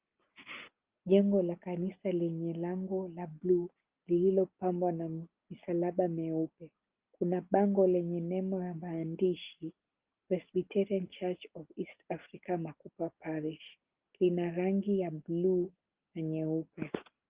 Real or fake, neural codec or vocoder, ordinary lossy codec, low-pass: real; none; Opus, 32 kbps; 3.6 kHz